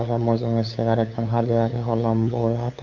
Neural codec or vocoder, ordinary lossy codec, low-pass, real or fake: codec, 16 kHz, 8 kbps, FunCodec, trained on LibriTTS, 25 frames a second; none; 7.2 kHz; fake